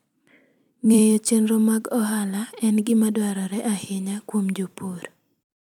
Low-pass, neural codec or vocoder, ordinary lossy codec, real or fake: 19.8 kHz; vocoder, 44.1 kHz, 128 mel bands every 256 samples, BigVGAN v2; none; fake